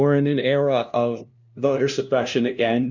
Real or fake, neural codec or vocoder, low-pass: fake; codec, 16 kHz, 0.5 kbps, FunCodec, trained on LibriTTS, 25 frames a second; 7.2 kHz